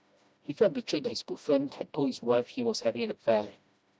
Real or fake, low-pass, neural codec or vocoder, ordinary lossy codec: fake; none; codec, 16 kHz, 1 kbps, FreqCodec, smaller model; none